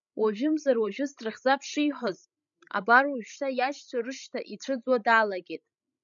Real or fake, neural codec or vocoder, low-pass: fake; codec, 16 kHz, 16 kbps, FreqCodec, larger model; 7.2 kHz